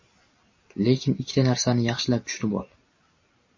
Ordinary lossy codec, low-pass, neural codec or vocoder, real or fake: MP3, 32 kbps; 7.2 kHz; none; real